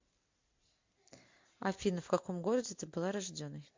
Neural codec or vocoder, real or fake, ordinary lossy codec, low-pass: none; real; MP3, 48 kbps; 7.2 kHz